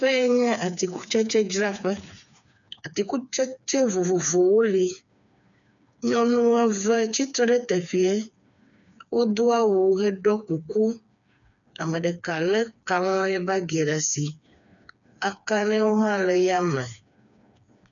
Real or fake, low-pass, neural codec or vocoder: fake; 7.2 kHz; codec, 16 kHz, 4 kbps, FreqCodec, smaller model